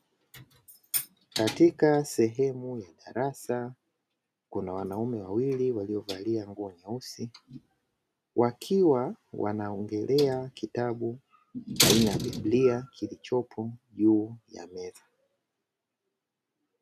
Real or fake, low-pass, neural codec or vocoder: real; 14.4 kHz; none